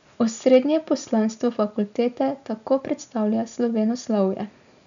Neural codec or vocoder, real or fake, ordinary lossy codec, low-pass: none; real; none; 7.2 kHz